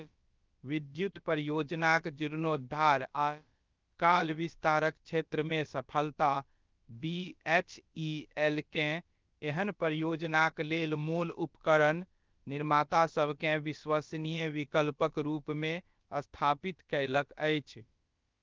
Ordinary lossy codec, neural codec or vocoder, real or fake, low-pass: Opus, 32 kbps; codec, 16 kHz, about 1 kbps, DyCAST, with the encoder's durations; fake; 7.2 kHz